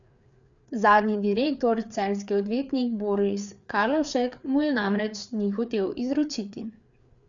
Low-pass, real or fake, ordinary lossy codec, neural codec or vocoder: 7.2 kHz; fake; none; codec, 16 kHz, 4 kbps, FreqCodec, larger model